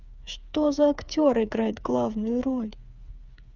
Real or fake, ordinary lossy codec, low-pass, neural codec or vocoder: fake; Opus, 64 kbps; 7.2 kHz; codec, 16 kHz, 8 kbps, FreqCodec, smaller model